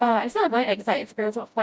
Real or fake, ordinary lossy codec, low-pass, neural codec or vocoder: fake; none; none; codec, 16 kHz, 0.5 kbps, FreqCodec, smaller model